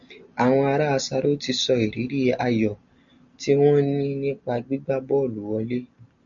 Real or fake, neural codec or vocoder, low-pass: real; none; 7.2 kHz